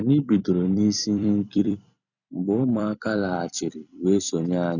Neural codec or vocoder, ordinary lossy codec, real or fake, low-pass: vocoder, 44.1 kHz, 128 mel bands every 256 samples, BigVGAN v2; none; fake; 7.2 kHz